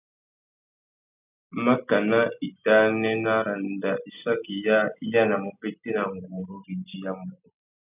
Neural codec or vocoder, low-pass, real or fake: none; 3.6 kHz; real